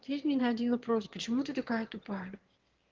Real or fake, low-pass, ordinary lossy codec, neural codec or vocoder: fake; 7.2 kHz; Opus, 16 kbps; autoencoder, 22.05 kHz, a latent of 192 numbers a frame, VITS, trained on one speaker